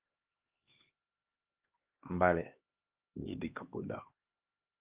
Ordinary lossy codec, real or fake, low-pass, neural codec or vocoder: Opus, 24 kbps; fake; 3.6 kHz; codec, 16 kHz, 2 kbps, X-Codec, HuBERT features, trained on LibriSpeech